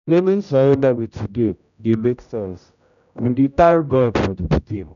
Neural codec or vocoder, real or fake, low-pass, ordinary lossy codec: codec, 16 kHz, 0.5 kbps, X-Codec, HuBERT features, trained on general audio; fake; 7.2 kHz; none